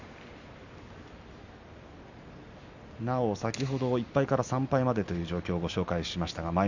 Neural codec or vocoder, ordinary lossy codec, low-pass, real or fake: none; none; 7.2 kHz; real